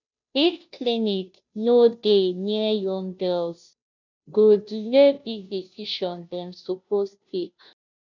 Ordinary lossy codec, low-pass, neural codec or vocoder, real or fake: none; 7.2 kHz; codec, 16 kHz, 0.5 kbps, FunCodec, trained on Chinese and English, 25 frames a second; fake